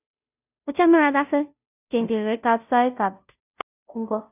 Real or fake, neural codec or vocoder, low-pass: fake; codec, 16 kHz, 0.5 kbps, FunCodec, trained on Chinese and English, 25 frames a second; 3.6 kHz